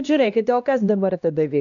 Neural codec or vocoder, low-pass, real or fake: codec, 16 kHz, 1 kbps, X-Codec, HuBERT features, trained on LibriSpeech; 7.2 kHz; fake